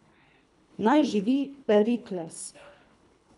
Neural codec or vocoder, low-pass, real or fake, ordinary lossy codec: codec, 24 kHz, 3 kbps, HILCodec; 10.8 kHz; fake; none